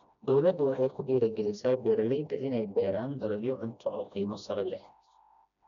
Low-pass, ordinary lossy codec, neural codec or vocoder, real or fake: 7.2 kHz; none; codec, 16 kHz, 1 kbps, FreqCodec, smaller model; fake